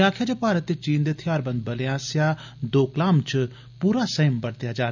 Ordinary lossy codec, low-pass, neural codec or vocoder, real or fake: none; 7.2 kHz; none; real